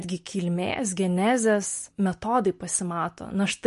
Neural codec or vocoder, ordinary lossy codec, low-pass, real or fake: none; MP3, 48 kbps; 14.4 kHz; real